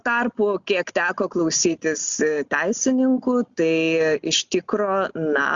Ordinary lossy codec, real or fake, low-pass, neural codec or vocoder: Opus, 64 kbps; real; 7.2 kHz; none